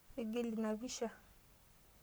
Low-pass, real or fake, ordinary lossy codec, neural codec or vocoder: none; fake; none; codec, 44.1 kHz, 7.8 kbps, Pupu-Codec